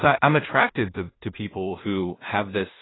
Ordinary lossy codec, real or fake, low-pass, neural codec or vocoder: AAC, 16 kbps; fake; 7.2 kHz; codec, 16 kHz in and 24 kHz out, 0.4 kbps, LongCat-Audio-Codec, two codebook decoder